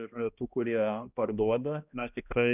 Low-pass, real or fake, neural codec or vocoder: 3.6 kHz; fake; codec, 16 kHz, 1 kbps, X-Codec, HuBERT features, trained on balanced general audio